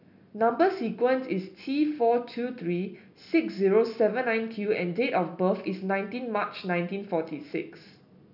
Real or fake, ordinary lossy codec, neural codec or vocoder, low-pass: real; none; none; 5.4 kHz